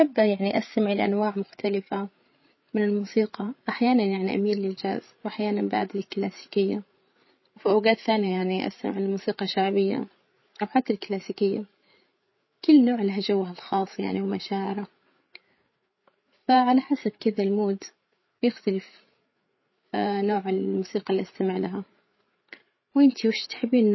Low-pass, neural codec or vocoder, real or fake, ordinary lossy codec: 7.2 kHz; codec, 16 kHz, 8 kbps, FreqCodec, larger model; fake; MP3, 24 kbps